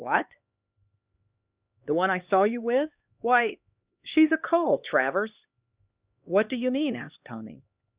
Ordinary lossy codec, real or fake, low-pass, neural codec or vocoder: Opus, 64 kbps; fake; 3.6 kHz; codec, 16 kHz, 2 kbps, X-Codec, HuBERT features, trained on LibriSpeech